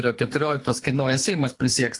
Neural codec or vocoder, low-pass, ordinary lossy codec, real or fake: codec, 24 kHz, 3 kbps, HILCodec; 10.8 kHz; AAC, 48 kbps; fake